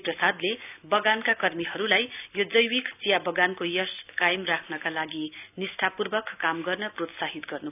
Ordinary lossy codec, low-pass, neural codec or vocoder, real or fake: none; 3.6 kHz; none; real